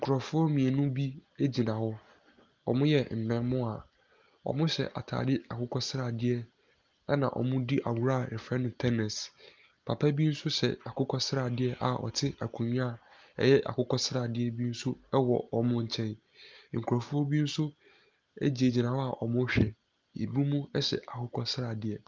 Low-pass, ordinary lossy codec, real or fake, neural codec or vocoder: 7.2 kHz; Opus, 32 kbps; real; none